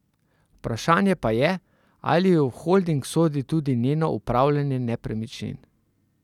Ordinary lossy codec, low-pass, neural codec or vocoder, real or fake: none; 19.8 kHz; none; real